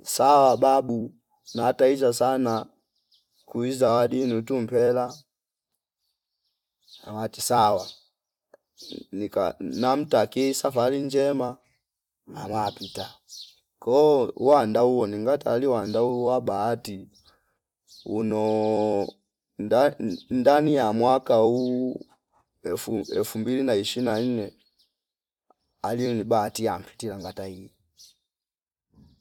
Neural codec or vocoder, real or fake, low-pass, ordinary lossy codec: vocoder, 44.1 kHz, 128 mel bands every 256 samples, BigVGAN v2; fake; 19.8 kHz; none